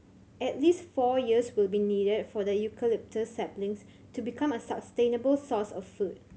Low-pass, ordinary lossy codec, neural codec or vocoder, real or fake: none; none; none; real